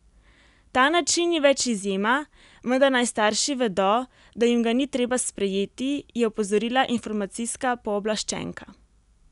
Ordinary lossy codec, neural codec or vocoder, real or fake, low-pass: none; none; real; 10.8 kHz